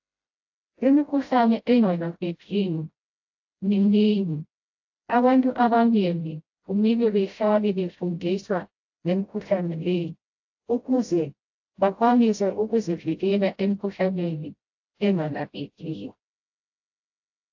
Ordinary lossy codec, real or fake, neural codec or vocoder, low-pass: AAC, 48 kbps; fake; codec, 16 kHz, 0.5 kbps, FreqCodec, smaller model; 7.2 kHz